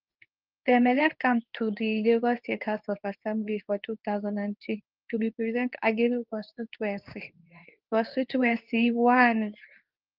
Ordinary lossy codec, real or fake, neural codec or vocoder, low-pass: Opus, 24 kbps; fake; codec, 24 kHz, 0.9 kbps, WavTokenizer, medium speech release version 2; 5.4 kHz